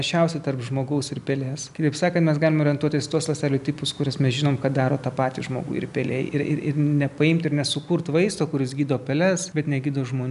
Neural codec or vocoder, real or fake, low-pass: none; real; 10.8 kHz